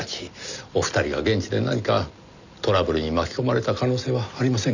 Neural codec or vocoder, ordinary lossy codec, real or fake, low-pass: none; none; real; 7.2 kHz